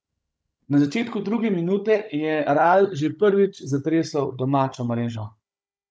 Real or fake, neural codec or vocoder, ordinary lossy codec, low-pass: fake; codec, 16 kHz, 16 kbps, FunCodec, trained on Chinese and English, 50 frames a second; none; none